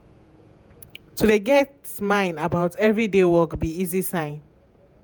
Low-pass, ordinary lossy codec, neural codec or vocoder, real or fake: none; none; vocoder, 48 kHz, 128 mel bands, Vocos; fake